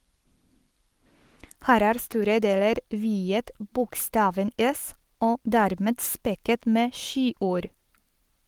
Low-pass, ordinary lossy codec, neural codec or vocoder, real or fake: 19.8 kHz; Opus, 24 kbps; codec, 44.1 kHz, 7.8 kbps, Pupu-Codec; fake